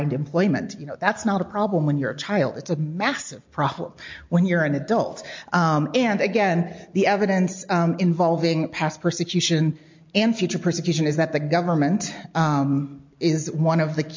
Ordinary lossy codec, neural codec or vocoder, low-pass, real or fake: MP3, 48 kbps; none; 7.2 kHz; real